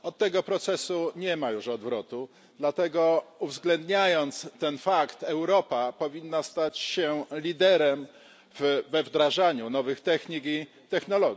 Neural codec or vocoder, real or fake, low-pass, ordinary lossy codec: none; real; none; none